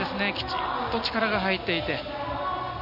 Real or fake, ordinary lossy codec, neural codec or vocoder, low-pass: real; none; none; 5.4 kHz